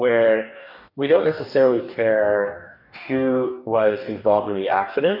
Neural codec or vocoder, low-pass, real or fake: codec, 44.1 kHz, 2.6 kbps, DAC; 5.4 kHz; fake